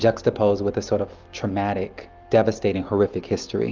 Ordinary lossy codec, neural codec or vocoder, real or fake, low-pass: Opus, 32 kbps; none; real; 7.2 kHz